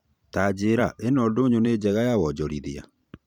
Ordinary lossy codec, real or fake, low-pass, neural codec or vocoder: none; fake; 19.8 kHz; vocoder, 44.1 kHz, 128 mel bands every 512 samples, BigVGAN v2